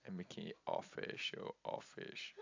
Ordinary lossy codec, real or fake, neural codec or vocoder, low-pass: AAC, 48 kbps; real; none; 7.2 kHz